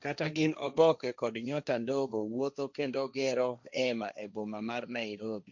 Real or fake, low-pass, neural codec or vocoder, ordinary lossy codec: fake; 7.2 kHz; codec, 16 kHz, 1.1 kbps, Voila-Tokenizer; none